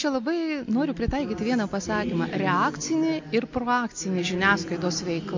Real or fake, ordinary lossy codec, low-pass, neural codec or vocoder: real; MP3, 48 kbps; 7.2 kHz; none